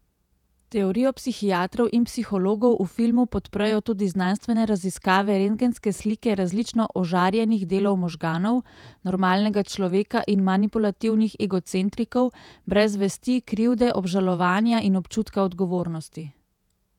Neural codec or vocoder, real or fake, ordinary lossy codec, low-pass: vocoder, 48 kHz, 128 mel bands, Vocos; fake; none; 19.8 kHz